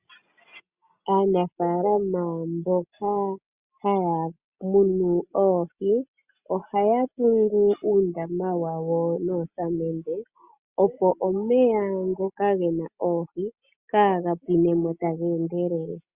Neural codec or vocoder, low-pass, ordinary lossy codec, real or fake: none; 3.6 kHz; Opus, 64 kbps; real